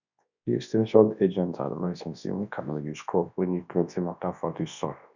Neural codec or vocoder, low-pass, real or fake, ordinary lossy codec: codec, 24 kHz, 0.9 kbps, WavTokenizer, large speech release; 7.2 kHz; fake; none